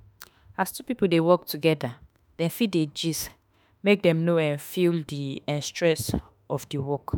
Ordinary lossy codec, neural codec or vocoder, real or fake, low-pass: none; autoencoder, 48 kHz, 32 numbers a frame, DAC-VAE, trained on Japanese speech; fake; none